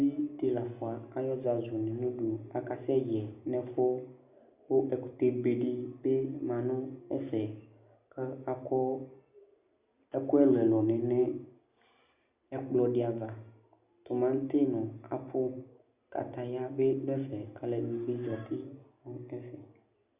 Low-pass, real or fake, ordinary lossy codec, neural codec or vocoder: 3.6 kHz; real; Opus, 64 kbps; none